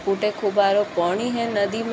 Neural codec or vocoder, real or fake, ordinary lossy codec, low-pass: none; real; none; none